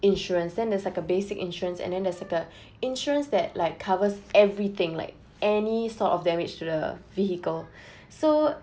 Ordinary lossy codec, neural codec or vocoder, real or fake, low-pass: none; none; real; none